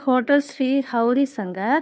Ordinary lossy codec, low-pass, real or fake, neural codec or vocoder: none; none; fake; codec, 16 kHz, 2 kbps, FunCodec, trained on Chinese and English, 25 frames a second